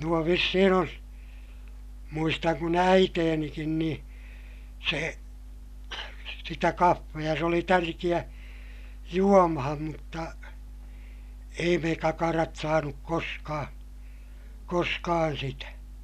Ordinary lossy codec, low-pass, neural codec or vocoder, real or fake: none; 14.4 kHz; none; real